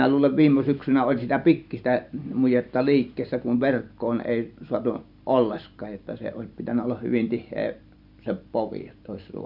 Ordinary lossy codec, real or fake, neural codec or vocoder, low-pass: none; fake; vocoder, 44.1 kHz, 128 mel bands every 512 samples, BigVGAN v2; 5.4 kHz